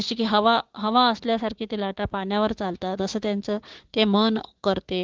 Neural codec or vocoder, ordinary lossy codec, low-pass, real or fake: codec, 16 kHz, 6 kbps, DAC; Opus, 16 kbps; 7.2 kHz; fake